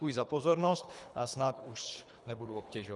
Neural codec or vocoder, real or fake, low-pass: codec, 24 kHz, 3 kbps, HILCodec; fake; 10.8 kHz